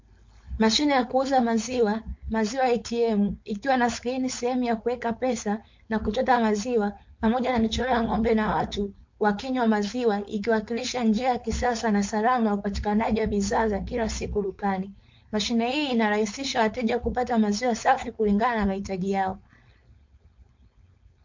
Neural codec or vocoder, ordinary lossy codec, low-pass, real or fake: codec, 16 kHz, 4.8 kbps, FACodec; MP3, 48 kbps; 7.2 kHz; fake